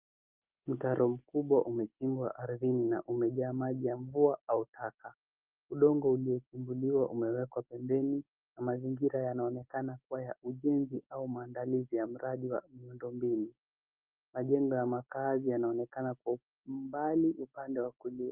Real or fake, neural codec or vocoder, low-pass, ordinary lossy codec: real; none; 3.6 kHz; Opus, 32 kbps